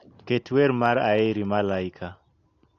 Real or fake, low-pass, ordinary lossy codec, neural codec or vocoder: real; 7.2 kHz; AAC, 48 kbps; none